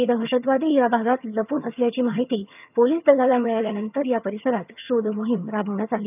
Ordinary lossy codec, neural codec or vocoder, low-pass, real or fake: none; vocoder, 22.05 kHz, 80 mel bands, HiFi-GAN; 3.6 kHz; fake